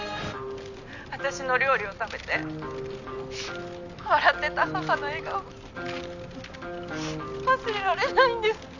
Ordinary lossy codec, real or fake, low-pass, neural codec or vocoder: none; real; 7.2 kHz; none